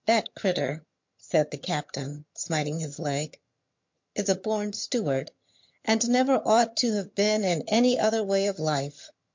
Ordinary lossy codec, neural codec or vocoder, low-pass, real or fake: MP3, 48 kbps; vocoder, 22.05 kHz, 80 mel bands, HiFi-GAN; 7.2 kHz; fake